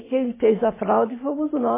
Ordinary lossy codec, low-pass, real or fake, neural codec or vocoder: MP3, 16 kbps; 3.6 kHz; real; none